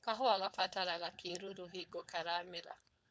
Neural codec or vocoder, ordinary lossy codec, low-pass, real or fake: codec, 16 kHz, 4.8 kbps, FACodec; none; none; fake